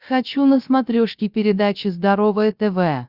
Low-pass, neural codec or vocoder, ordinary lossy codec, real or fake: 5.4 kHz; codec, 16 kHz, 0.7 kbps, FocalCodec; Opus, 64 kbps; fake